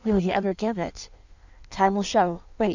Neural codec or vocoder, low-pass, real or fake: codec, 16 kHz in and 24 kHz out, 1.1 kbps, FireRedTTS-2 codec; 7.2 kHz; fake